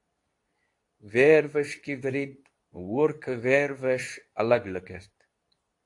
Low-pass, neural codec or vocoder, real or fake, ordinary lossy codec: 10.8 kHz; codec, 24 kHz, 0.9 kbps, WavTokenizer, medium speech release version 2; fake; AAC, 48 kbps